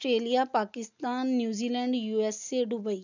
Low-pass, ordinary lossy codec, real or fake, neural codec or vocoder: 7.2 kHz; none; real; none